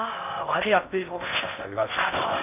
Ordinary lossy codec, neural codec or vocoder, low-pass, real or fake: none; codec, 16 kHz in and 24 kHz out, 0.6 kbps, FocalCodec, streaming, 4096 codes; 3.6 kHz; fake